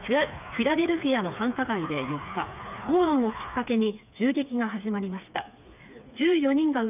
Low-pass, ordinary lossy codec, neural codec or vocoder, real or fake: 3.6 kHz; none; codec, 16 kHz, 4 kbps, FreqCodec, smaller model; fake